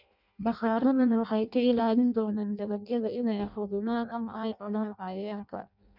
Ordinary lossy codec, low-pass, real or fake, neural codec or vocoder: none; 5.4 kHz; fake; codec, 16 kHz in and 24 kHz out, 0.6 kbps, FireRedTTS-2 codec